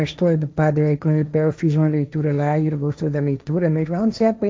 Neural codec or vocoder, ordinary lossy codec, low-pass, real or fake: codec, 16 kHz, 1.1 kbps, Voila-Tokenizer; none; none; fake